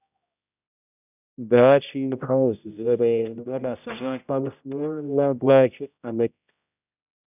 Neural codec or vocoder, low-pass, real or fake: codec, 16 kHz, 0.5 kbps, X-Codec, HuBERT features, trained on general audio; 3.6 kHz; fake